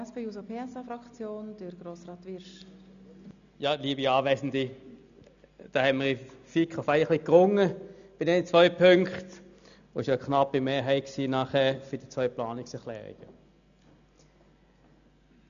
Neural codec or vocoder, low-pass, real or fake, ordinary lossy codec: none; 7.2 kHz; real; none